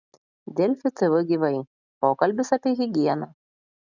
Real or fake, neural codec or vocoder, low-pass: real; none; 7.2 kHz